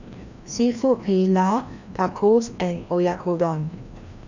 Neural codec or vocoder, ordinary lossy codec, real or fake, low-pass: codec, 16 kHz, 1 kbps, FreqCodec, larger model; none; fake; 7.2 kHz